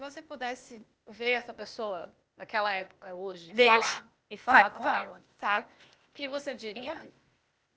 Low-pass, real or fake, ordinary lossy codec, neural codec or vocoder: none; fake; none; codec, 16 kHz, 0.8 kbps, ZipCodec